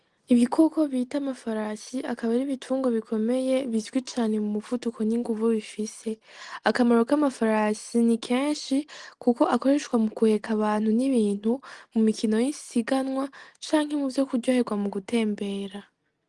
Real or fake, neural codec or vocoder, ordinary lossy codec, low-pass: real; none; Opus, 24 kbps; 10.8 kHz